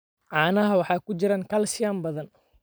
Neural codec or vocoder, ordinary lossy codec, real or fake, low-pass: vocoder, 44.1 kHz, 128 mel bands every 256 samples, BigVGAN v2; none; fake; none